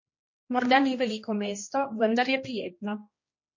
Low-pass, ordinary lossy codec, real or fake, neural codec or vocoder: 7.2 kHz; MP3, 32 kbps; fake; codec, 16 kHz, 1 kbps, X-Codec, HuBERT features, trained on general audio